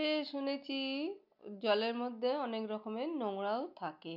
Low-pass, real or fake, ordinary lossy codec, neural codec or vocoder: 5.4 kHz; real; none; none